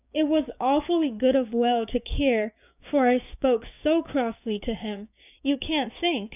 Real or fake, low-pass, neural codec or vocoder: fake; 3.6 kHz; codec, 16 kHz, 6 kbps, DAC